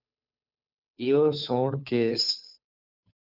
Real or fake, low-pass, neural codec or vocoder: fake; 5.4 kHz; codec, 16 kHz, 2 kbps, FunCodec, trained on Chinese and English, 25 frames a second